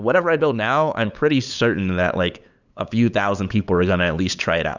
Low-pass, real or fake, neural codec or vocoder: 7.2 kHz; fake; codec, 16 kHz, 8 kbps, FunCodec, trained on LibriTTS, 25 frames a second